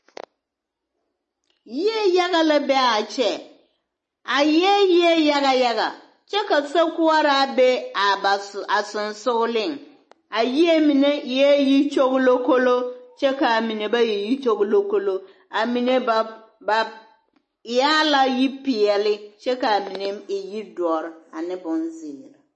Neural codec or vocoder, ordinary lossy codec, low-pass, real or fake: none; MP3, 32 kbps; 10.8 kHz; real